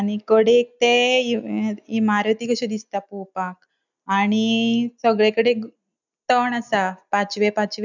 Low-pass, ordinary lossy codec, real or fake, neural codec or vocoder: 7.2 kHz; none; real; none